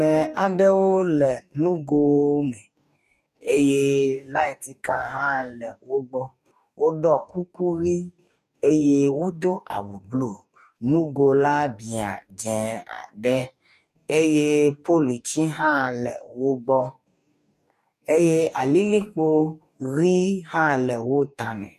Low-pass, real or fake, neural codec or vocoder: 14.4 kHz; fake; codec, 44.1 kHz, 2.6 kbps, DAC